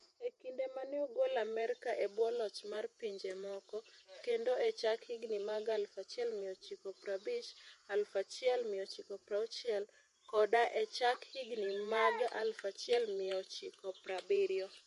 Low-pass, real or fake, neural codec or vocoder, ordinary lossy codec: 14.4 kHz; fake; vocoder, 48 kHz, 128 mel bands, Vocos; MP3, 48 kbps